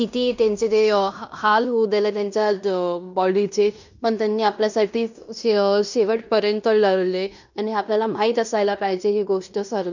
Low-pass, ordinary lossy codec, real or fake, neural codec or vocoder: 7.2 kHz; none; fake; codec, 16 kHz in and 24 kHz out, 0.9 kbps, LongCat-Audio-Codec, fine tuned four codebook decoder